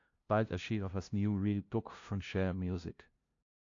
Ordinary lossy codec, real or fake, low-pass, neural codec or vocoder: AAC, 48 kbps; fake; 7.2 kHz; codec, 16 kHz, 0.5 kbps, FunCodec, trained on LibriTTS, 25 frames a second